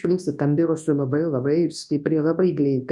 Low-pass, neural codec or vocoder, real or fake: 10.8 kHz; codec, 24 kHz, 0.9 kbps, WavTokenizer, large speech release; fake